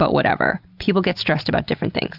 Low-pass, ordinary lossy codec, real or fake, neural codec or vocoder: 5.4 kHz; Opus, 64 kbps; real; none